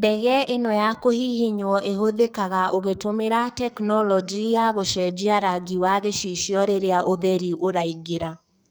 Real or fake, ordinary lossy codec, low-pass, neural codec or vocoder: fake; none; none; codec, 44.1 kHz, 2.6 kbps, SNAC